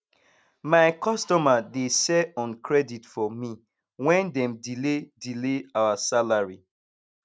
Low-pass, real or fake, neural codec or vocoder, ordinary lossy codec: none; real; none; none